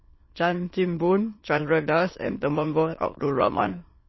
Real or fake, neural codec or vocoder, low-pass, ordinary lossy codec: fake; autoencoder, 22.05 kHz, a latent of 192 numbers a frame, VITS, trained on many speakers; 7.2 kHz; MP3, 24 kbps